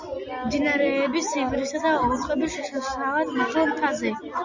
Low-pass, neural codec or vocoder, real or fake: 7.2 kHz; none; real